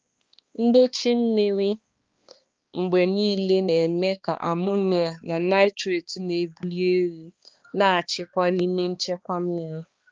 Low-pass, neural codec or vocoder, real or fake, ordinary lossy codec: 7.2 kHz; codec, 16 kHz, 2 kbps, X-Codec, HuBERT features, trained on balanced general audio; fake; Opus, 32 kbps